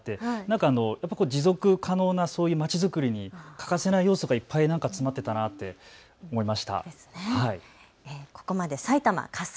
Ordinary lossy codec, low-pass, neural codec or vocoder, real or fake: none; none; none; real